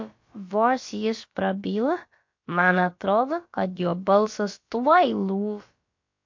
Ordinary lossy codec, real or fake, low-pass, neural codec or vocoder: MP3, 48 kbps; fake; 7.2 kHz; codec, 16 kHz, about 1 kbps, DyCAST, with the encoder's durations